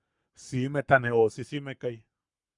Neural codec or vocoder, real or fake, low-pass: codec, 44.1 kHz, 7.8 kbps, Pupu-Codec; fake; 10.8 kHz